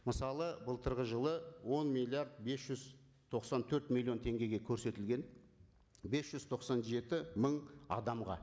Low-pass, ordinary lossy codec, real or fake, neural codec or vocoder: none; none; real; none